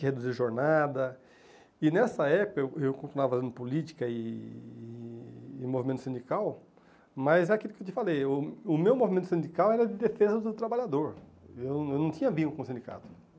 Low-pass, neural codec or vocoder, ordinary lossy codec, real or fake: none; none; none; real